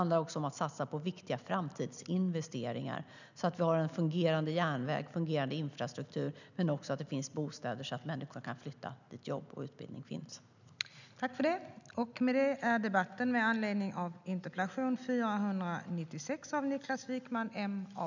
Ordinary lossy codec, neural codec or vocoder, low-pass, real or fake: none; none; 7.2 kHz; real